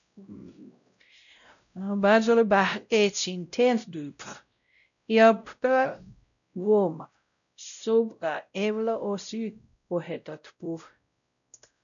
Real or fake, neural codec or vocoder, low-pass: fake; codec, 16 kHz, 0.5 kbps, X-Codec, WavLM features, trained on Multilingual LibriSpeech; 7.2 kHz